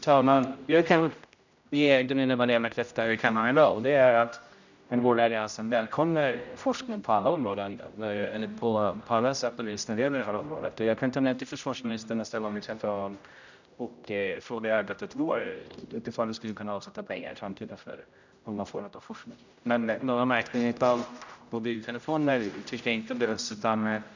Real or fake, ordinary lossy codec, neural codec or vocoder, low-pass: fake; none; codec, 16 kHz, 0.5 kbps, X-Codec, HuBERT features, trained on general audio; 7.2 kHz